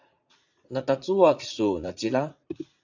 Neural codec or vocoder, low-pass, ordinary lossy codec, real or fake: vocoder, 44.1 kHz, 80 mel bands, Vocos; 7.2 kHz; AAC, 48 kbps; fake